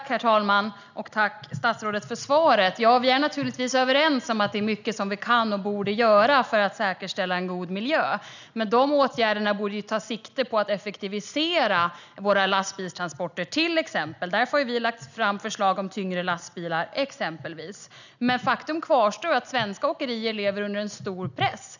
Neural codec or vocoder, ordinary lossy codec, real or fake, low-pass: none; none; real; 7.2 kHz